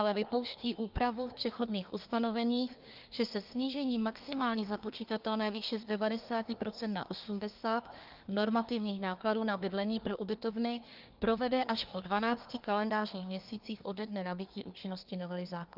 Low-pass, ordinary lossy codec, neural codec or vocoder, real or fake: 5.4 kHz; Opus, 24 kbps; codec, 24 kHz, 1 kbps, SNAC; fake